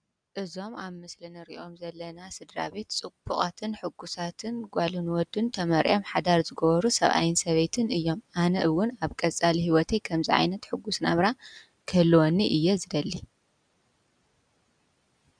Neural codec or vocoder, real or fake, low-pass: none; real; 9.9 kHz